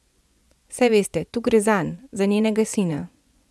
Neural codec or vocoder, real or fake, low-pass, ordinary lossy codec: vocoder, 24 kHz, 100 mel bands, Vocos; fake; none; none